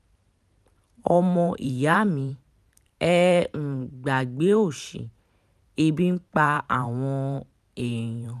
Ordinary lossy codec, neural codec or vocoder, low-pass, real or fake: none; vocoder, 44.1 kHz, 128 mel bands every 512 samples, BigVGAN v2; 14.4 kHz; fake